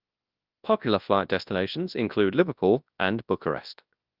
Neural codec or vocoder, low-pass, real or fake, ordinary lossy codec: codec, 24 kHz, 0.9 kbps, WavTokenizer, large speech release; 5.4 kHz; fake; Opus, 32 kbps